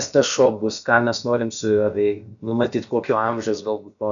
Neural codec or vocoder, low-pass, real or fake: codec, 16 kHz, about 1 kbps, DyCAST, with the encoder's durations; 7.2 kHz; fake